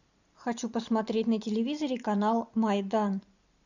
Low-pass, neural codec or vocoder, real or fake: 7.2 kHz; none; real